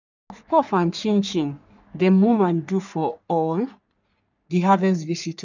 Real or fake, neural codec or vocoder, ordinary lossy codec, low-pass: fake; codec, 44.1 kHz, 3.4 kbps, Pupu-Codec; none; 7.2 kHz